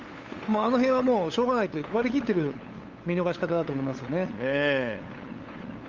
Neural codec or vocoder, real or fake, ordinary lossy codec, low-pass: codec, 16 kHz, 8 kbps, FunCodec, trained on LibriTTS, 25 frames a second; fake; Opus, 32 kbps; 7.2 kHz